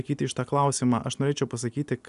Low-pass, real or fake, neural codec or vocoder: 10.8 kHz; real; none